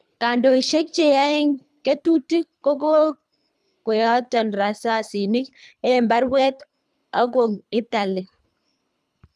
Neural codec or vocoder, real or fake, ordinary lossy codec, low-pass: codec, 24 kHz, 3 kbps, HILCodec; fake; none; none